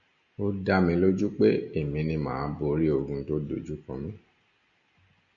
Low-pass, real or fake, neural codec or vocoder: 7.2 kHz; real; none